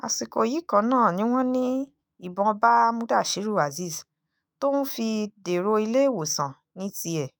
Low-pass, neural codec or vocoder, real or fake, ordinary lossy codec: none; autoencoder, 48 kHz, 128 numbers a frame, DAC-VAE, trained on Japanese speech; fake; none